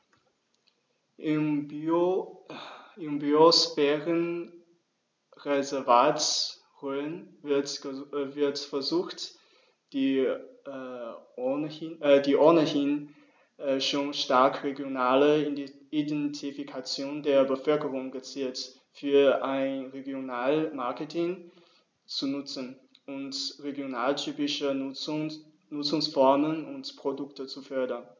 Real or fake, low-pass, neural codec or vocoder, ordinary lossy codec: real; none; none; none